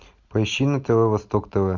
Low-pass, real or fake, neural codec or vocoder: 7.2 kHz; real; none